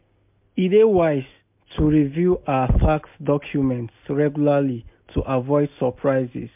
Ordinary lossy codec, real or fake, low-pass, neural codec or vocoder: MP3, 32 kbps; real; 3.6 kHz; none